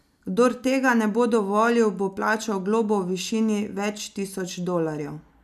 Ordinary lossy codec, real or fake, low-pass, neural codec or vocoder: none; real; 14.4 kHz; none